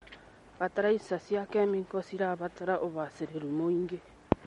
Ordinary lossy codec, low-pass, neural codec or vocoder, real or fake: MP3, 48 kbps; 19.8 kHz; none; real